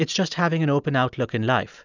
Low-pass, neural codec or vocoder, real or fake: 7.2 kHz; none; real